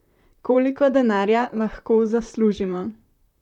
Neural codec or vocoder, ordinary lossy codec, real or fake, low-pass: vocoder, 44.1 kHz, 128 mel bands, Pupu-Vocoder; none; fake; 19.8 kHz